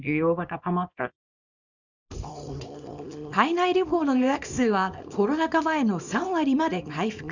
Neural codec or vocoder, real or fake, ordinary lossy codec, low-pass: codec, 24 kHz, 0.9 kbps, WavTokenizer, small release; fake; none; 7.2 kHz